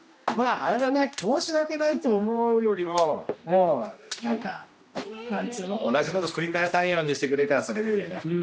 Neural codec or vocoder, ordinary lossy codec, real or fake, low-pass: codec, 16 kHz, 1 kbps, X-Codec, HuBERT features, trained on general audio; none; fake; none